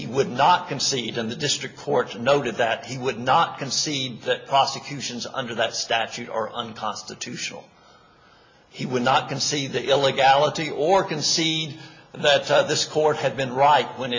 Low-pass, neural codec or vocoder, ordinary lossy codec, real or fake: 7.2 kHz; none; MP3, 32 kbps; real